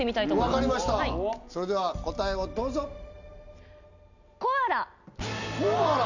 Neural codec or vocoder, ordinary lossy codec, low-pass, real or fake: none; none; 7.2 kHz; real